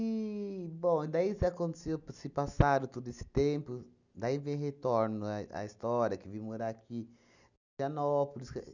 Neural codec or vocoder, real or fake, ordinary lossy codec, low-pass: none; real; none; 7.2 kHz